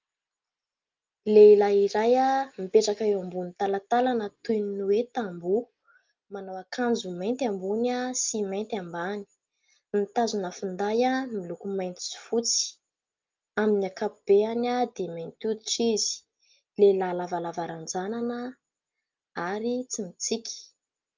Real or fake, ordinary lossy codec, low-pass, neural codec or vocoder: real; Opus, 24 kbps; 7.2 kHz; none